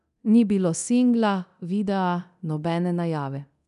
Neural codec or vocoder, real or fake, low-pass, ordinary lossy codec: codec, 24 kHz, 0.9 kbps, DualCodec; fake; 10.8 kHz; none